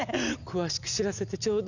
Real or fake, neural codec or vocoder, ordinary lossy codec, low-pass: real; none; none; 7.2 kHz